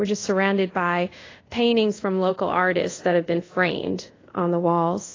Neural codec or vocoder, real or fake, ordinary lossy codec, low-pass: codec, 24 kHz, 0.5 kbps, DualCodec; fake; AAC, 32 kbps; 7.2 kHz